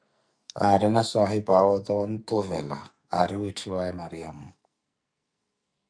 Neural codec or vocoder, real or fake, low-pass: codec, 44.1 kHz, 2.6 kbps, SNAC; fake; 9.9 kHz